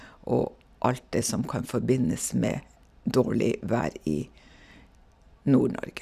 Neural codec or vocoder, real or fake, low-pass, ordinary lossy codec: vocoder, 44.1 kHz, 128 mel bands every 256 samples, BigVGAN v2; fake; 14.4 kHz; none